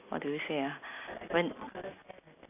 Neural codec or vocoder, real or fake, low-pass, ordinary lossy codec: none; real; 3.6 kHz; AAC, 24 kbps